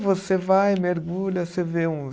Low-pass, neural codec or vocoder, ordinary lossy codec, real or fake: none; none; none; real